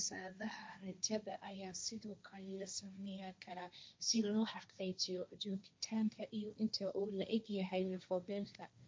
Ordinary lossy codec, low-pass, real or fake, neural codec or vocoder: none; none; fake; codec, 16 kHz, 1.1 kbps, Voila-Tokenizer